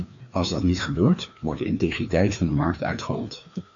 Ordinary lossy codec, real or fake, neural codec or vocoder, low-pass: MP3, 48 kbps; fake; codec, 16 kHz, 2 kbps, FreqCodec, larger model; 7.2 kHz